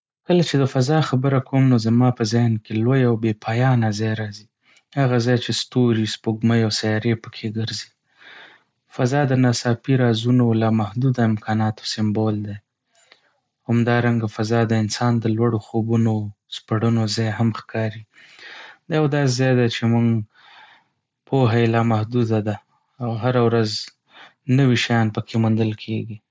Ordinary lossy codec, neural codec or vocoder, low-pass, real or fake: none; none; none; real